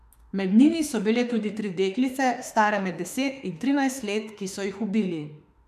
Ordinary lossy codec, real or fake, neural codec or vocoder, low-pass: none; fake; autoencoder, 48 kHz, 32 numbers a frame, DAC-VAE, trained on Japanese speech; 14.4 kHz